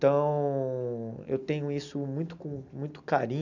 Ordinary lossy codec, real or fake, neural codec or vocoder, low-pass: none; real; none; 7.2 kHz